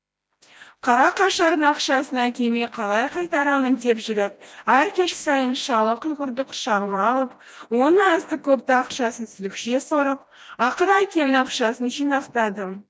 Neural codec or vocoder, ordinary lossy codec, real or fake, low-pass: codec, 16 kHz, 1 kbps, FreqCodec, smaller model; none; fake; none